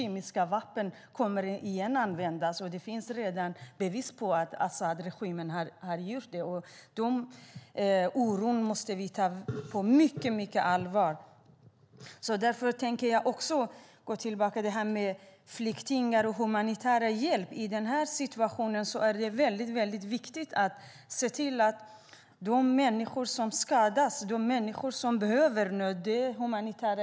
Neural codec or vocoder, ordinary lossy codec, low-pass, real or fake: none; none; none; real